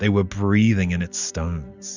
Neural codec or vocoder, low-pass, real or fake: none; 7.2 kHz; real